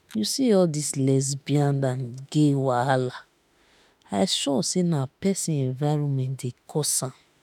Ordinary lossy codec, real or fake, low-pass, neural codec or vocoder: none; fake; 19.8 kHz; autoencoder, 48 kHz, 32 numbers a frame, DAC-VAE, trained on Japanese speech